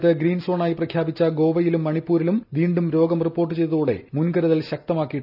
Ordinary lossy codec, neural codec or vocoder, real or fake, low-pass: none; none; real; 5.4 kHz